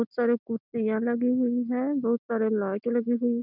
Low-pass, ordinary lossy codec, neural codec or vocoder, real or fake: 5.4 kHz; none; none; real